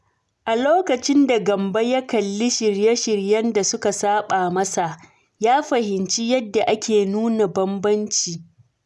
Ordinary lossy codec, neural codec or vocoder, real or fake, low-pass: none; none; real; none